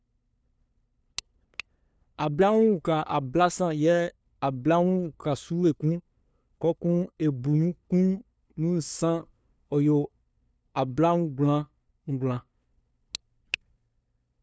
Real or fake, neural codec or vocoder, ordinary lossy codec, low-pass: fake; codec, 16 kHz, 2 kbps, FunCodec, trained on LibriTTS, 25 frames a second; none; none